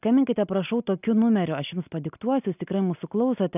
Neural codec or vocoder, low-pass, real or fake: none; 3.6 kHz; real